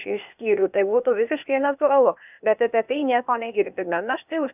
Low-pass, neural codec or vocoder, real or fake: 3.6 kHz; codec, 16 kHz, 0.8 kbps, ZipCodec; fake